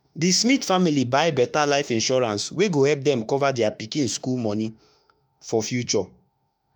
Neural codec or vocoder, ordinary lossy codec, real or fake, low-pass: autoencoder, 48 kHz, 32 numbers a frame, DAC-VAE, trained on Japanese speech; none; fake; none